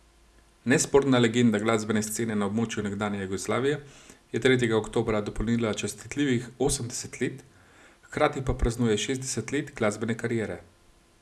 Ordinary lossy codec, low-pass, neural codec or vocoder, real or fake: none; none; none; real